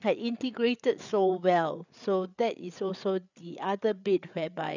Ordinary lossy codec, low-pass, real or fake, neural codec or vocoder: none; 7.2 kHz; fake; codec, 16 kHz, 8 kbps, FreqCodec, larger model